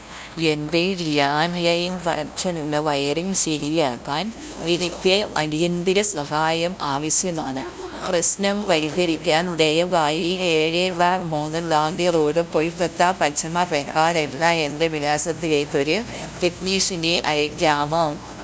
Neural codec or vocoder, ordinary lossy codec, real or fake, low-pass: codec, 16 kHz, 0.5 kbps, FunCodec, trained on LibriTTS, 25 frames a second; none; fake; none